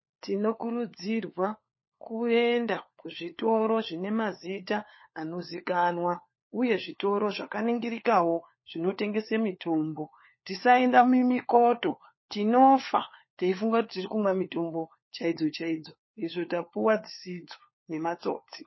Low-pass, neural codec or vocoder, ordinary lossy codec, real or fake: 7.2 kHz; codec, 16 kHz, 4 kbps, FunCodec, trained on LibriTTS, 50 frames a second; MP3, 24 kbps; fake